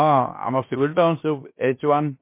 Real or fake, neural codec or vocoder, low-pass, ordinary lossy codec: fake; codec, 16 kHz, 0.7 kbps, FocalCodec; 3.6 kHz; MP3, 24 kbps